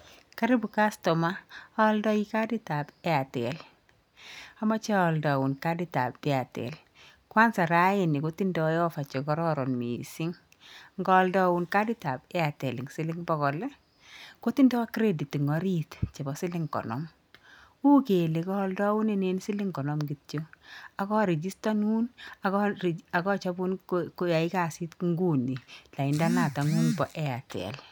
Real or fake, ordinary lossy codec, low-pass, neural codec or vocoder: real; none; none; none